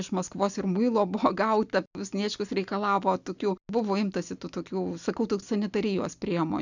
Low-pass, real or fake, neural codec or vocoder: 7.2 kHz; real; none